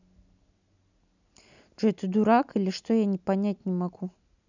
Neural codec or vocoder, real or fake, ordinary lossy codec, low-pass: none; real; none; 7.2 kHz